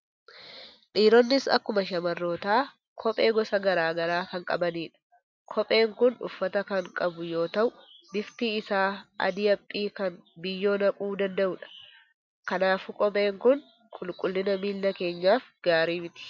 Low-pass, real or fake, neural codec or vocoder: 7.2 kHz; real; none